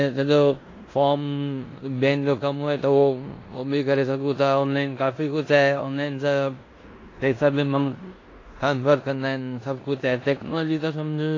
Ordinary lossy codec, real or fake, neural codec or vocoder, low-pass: AAC, 32 kbps; fake; codec, 16 kHz in and 24 kHz out, 0.9 kbps, LongCat-Audio-Codec, four codebook decoder; 7.2 kHz